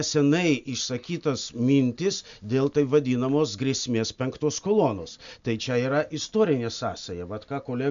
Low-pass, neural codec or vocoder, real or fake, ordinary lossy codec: 7.2 kHz; none; real; MP3, 64 kbps